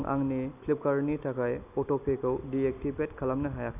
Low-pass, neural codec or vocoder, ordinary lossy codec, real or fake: 3.6 kHz; none; none; real